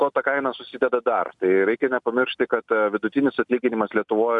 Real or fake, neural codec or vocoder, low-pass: real; none; 10.8 kHz